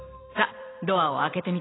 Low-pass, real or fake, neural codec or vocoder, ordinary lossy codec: 7.2 kHz; fake; vocoder, 44.1 kHz, 128 mel bands every 256 samples, BigVGAN v2; AAC, 16 kbps